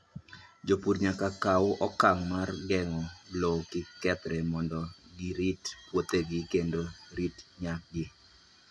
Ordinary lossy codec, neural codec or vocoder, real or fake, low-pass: none; none; real; none